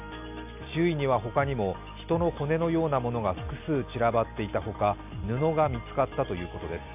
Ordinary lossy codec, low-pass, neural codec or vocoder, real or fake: none; 3.6 kHz; none; real